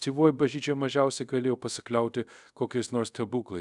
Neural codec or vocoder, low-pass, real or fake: codec, 24 kHz, 0.5 kbps, DualCodec; 10.8 kHz; fake